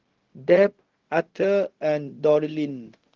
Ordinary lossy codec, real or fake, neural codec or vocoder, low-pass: Opus, 16 kbps; fake; codec, 16 kHz, 0.4 kbps, LongCat-Audio-Codec; 7.2 kHz